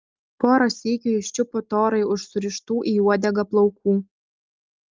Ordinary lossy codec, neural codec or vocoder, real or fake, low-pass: Opus, 32 kbps; none; real; 7.2 kHz